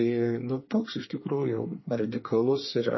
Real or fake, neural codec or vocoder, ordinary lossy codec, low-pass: fake; codec, 24 kHz, 1 kbps, SNAC; MP3, 24 kbps; 7.2 kHz